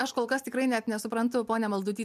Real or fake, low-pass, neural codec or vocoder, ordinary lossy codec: real; 14.4 kHz; none; MP3, 96 kbps